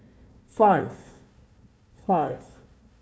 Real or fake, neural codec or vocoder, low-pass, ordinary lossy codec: fake; codec, 16 kHz, 1 kbps, FunCodec, trained on Chinese and English, 50 frames a second; none; none